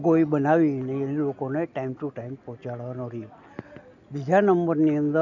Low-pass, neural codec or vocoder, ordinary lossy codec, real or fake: 7.2 kHz; none; none; real